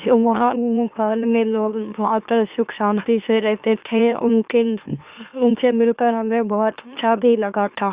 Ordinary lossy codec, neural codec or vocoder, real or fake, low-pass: Opus, 64 kbps; autoencoder, 44.1 kHz, a latent of 192 numbers a frame, MeloTTS; fake; 3.6 kHz